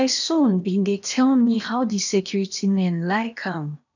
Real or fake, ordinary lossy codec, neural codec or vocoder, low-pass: fake; none; codec, 16 kHz in and 24 kHz out, 0.8 kbps, FocalCodec, streaming, 65536 codes; 7.2 kHz